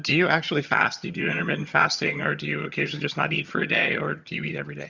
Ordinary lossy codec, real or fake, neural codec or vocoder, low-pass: Opus, 64 kbps; fake; vocoder, 22.05 kHz, 80 mel bands, HiFi-GAN; 7.2 kHz